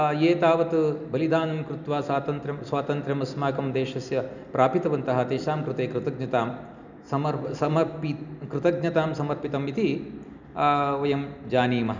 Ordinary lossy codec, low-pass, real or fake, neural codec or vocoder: none; 7.2 kHz; real; none